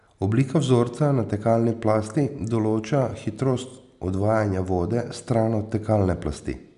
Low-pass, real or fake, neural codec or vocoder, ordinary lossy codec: 10.8 kHz; real; none; none